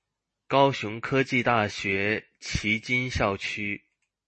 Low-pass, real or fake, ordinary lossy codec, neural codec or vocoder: 10.8 kHz; real; MP3, 32 kbps; none